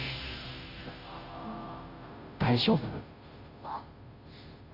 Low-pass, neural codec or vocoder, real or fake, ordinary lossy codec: 5.4 kHz; codec, 16 kHz, 0.5 kbps, FunCodec, trained on Chinese and English, 25 frames a second; fake; AAC, 32 kbps